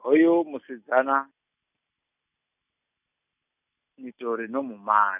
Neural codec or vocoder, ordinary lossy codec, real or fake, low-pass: none; none; real; 3.6 kHz